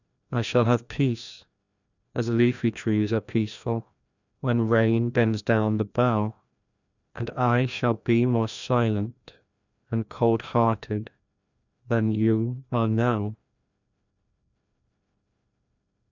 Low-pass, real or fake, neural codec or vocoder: 7.2 kHz; fake; codec, 16 kHz, 1 kbps, FreqCodec, larger model